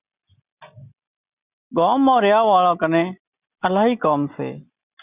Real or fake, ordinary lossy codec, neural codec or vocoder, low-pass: real; Opus, 64 kbps; none; 3.6 kHz